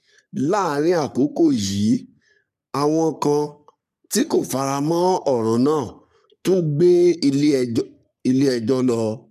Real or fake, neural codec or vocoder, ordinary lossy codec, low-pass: fake; codec, 44.1 kHz, 3.4 kbps, Pupu-Codec; none; 14.4 kHz